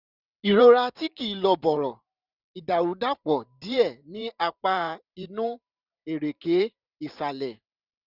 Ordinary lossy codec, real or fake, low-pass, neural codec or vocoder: none; fake; 5.4 kHz; vocoder, 44.1 kHz, 128 mel bands every 512 samples, BigVGAN v2